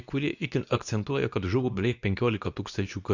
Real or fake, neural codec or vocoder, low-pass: fake; codec, 24 kHz, 0.9 kbps, WavTokenizer, medium speech release version 2; 7.2 kHz